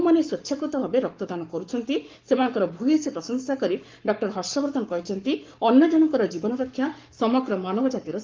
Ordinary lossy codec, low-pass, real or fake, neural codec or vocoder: Opus, 32 kbps; 7.2 kHz; fake; codec, 44.1 kHz, 7.8 kbps, Pupu-Codec